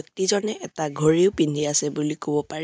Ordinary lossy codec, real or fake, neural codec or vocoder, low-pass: none; real; none; none